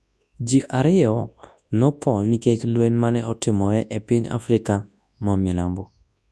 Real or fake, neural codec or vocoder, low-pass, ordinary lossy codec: fake; codec, 24 kHz, 0.9 kbps, WavTokenizer, large speech release; none; none